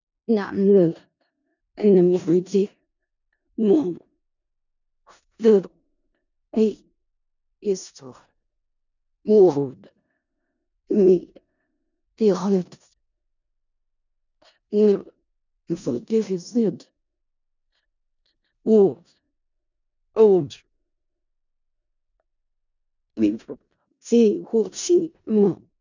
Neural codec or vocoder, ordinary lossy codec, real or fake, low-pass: codec, 16 kHz in and 24 kHz out, 0.4 kbps, LongCat-Audio-Codec, four codebook decoder; none; fake; 7.2 kHz